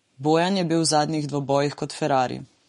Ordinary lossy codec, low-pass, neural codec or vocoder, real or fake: MP3, 48 kbps; 19.8 kHz; codec, 44.1 kHz, 7.8 kbps, Pupu-Codec; fake